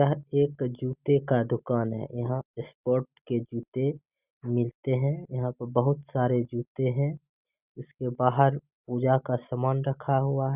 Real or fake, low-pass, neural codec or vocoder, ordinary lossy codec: real; 3.6 kHz; none; Opus, 64 kbps